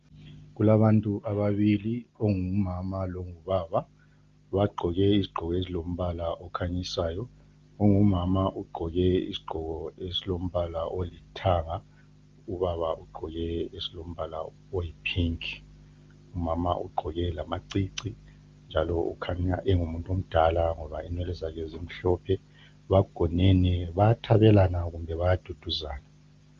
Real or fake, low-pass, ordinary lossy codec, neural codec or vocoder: real; 7.2 kHz; Opus, 32 kbps; none